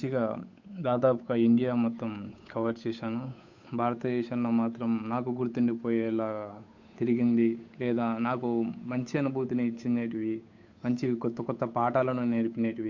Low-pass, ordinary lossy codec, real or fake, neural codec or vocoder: 7.2 kHz; MP3, 64 kbps; fake; codec, 16 kHz, 8 kbps, FunCodec, trained on Chinese and English, 25 frames a second